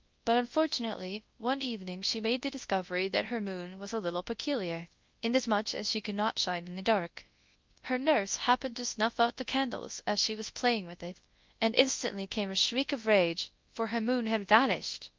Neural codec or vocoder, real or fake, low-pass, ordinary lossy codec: codec, 24 kHz, 0.9 kbps, WavTokenizer, large speech release; fake; 7.2 kHz; Opus, 32 kbps